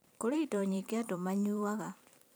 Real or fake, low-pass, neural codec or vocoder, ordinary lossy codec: fake; none; vocoder, 44.1 kHz, 128 mel bands every 512 samples, BigVGAN v2; none